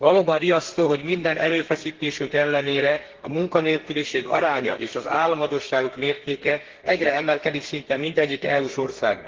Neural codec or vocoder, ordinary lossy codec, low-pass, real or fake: codec, 32 kHz, 1.9 kbps, SNAC; Opus, 16 kbps; 7.2 kHz; fake